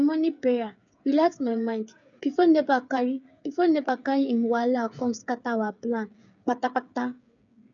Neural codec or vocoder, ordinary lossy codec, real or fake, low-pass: codec, 16 kHz, 8 kbps, FreqCodec, smaller model; none; fake; 7.2 kHz